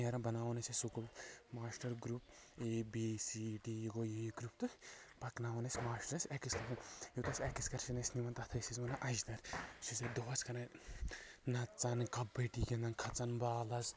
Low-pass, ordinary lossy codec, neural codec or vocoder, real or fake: none; none; none; real